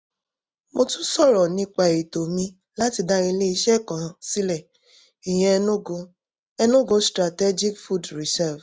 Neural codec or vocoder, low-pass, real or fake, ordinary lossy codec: none; none; real; none